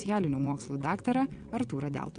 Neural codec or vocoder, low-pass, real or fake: vocoder, 22.05 kHz, 80 mel bands, WaveNeXt; 9.9 kHz; fake